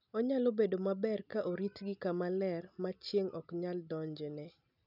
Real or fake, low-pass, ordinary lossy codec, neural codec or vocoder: real; 5.4 kHz; none; none